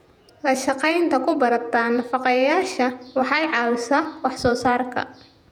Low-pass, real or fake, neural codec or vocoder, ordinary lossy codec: 19.8 kHz; fake; vocoder, 48 kHz, 128 mel bands, Vocos; none